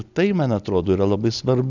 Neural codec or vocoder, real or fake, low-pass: none; real; 7.2 kHz